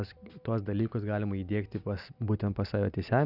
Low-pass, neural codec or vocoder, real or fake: 5.4 kHz; none; real